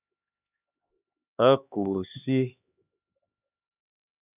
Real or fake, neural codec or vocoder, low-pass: fake; codec, 16 kHz, 2 kbps, X-Codec, HuBERT features, trained on LibriSpeech; 3.6 kHz